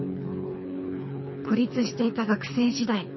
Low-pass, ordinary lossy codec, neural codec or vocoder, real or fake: 7.2 kHz; MP3, 24 kbps; codec, 24 kHz, 3 kbps, HILCodec; fake